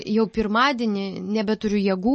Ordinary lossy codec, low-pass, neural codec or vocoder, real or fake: MP3, 32 kbps; 10.8 kHz; none; real